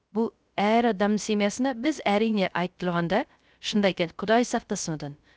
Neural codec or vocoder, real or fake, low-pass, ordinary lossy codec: codec, 16 kHz, 0.3 kbps, FocalCodec; fake; none; none